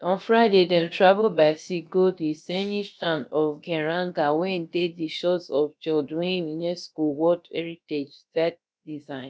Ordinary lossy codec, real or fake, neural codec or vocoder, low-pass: none; fake; codec, 16 kHz, about 1 kbps, DyCAST, with the encoder's durations; none